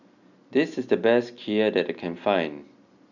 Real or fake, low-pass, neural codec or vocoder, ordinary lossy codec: real; 7.2 kHz; none; none